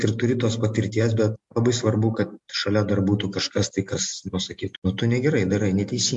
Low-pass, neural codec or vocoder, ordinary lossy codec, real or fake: 10.8 kHz; none; MP3, 64 kbps; real